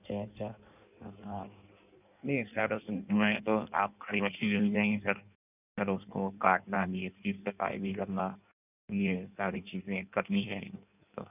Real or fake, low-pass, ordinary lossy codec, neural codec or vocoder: fake; 3.6 kHz; none; codec, 16 kHz in and 24 kHz out, 1.1 kbps, FireRedTTS-2 codec